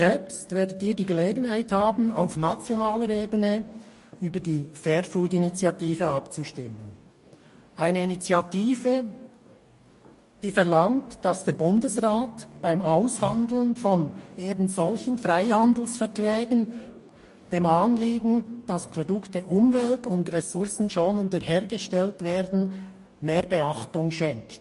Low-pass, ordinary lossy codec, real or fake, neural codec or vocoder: 14.4 kHz; MP3, 48 kbps; fake; codec, 44.1 kHz, 2.6 kbps, DAC